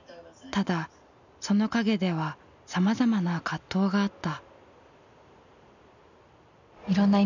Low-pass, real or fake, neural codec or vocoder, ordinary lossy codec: 7.2 kHz; real; none; none